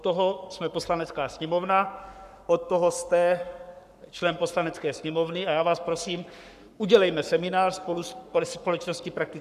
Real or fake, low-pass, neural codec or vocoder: fake; 14.4 kHz; codec, 44.1 kHz, 7.8 kbps, Pupu-Codec